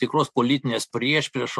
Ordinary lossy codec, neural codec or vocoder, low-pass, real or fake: MP3, 64 kbps; none; 14.4 kHz; real